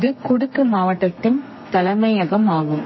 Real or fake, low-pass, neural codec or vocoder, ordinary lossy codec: fake; 7.2 kHz; codec, 32 kHz, 1.9 kbps, SNAC; MP3, 24 kbps